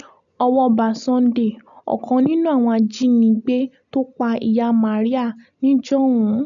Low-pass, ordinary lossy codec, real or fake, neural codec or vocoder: 7.2 kHz; none; real; none